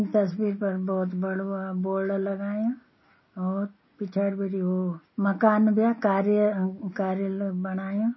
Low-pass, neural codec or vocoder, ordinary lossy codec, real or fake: 7.2 kHz; none; MP3, 24 kbps; real